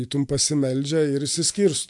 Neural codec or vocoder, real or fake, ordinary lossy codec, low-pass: none; real; AAC, 64 kbps; 10.8 kHz